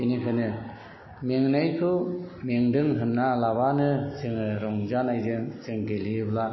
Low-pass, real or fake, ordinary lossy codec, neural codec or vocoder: 7.2 kHz; real; MP3, 24 kbps; none